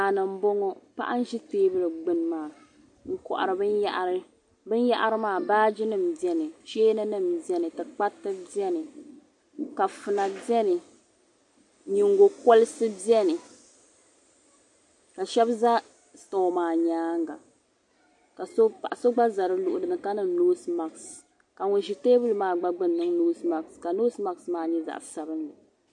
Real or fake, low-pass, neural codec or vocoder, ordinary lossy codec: real; 10.8 kHz; none; MP3, 48 kbps